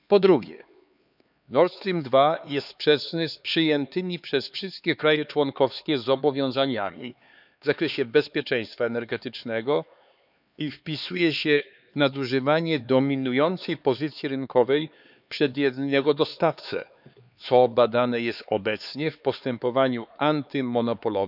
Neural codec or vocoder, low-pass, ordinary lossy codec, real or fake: codec, 16 kHz, 4 kbps, X-Codec, HuBERT features, trained on LibriSpeech; 5.4 kHz; none; fake